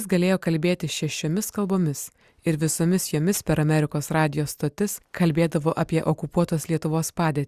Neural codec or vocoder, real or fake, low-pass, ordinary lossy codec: none; real; 14.4 kHz; Opus, 64 kbps